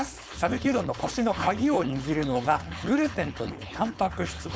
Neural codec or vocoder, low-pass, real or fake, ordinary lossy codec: codec, 16 kHz, 4.8 kbps, FACodec; none; fake; none